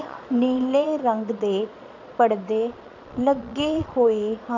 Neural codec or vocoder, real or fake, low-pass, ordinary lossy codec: vocoder, 22.05 kHz, 80 mel bands, Vocos; fake; 7.2 kHz; none